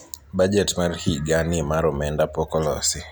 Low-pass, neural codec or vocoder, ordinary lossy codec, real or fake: none; none; none; real